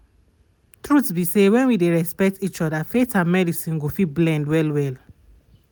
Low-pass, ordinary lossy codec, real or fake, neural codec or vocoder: none; none; real; none